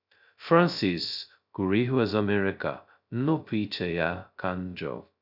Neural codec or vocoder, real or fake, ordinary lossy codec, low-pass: codec, 16 kHz, 0.2 kbps, FocalCodec; fake; none; 5.4 kHz